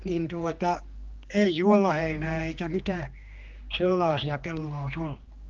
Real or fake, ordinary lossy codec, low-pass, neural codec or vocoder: fake; Opus, 32 kbps; 7.2 kHz; codec, 16 kHz, 2 kbps, X-Codec, HuBERT features, trained on general audio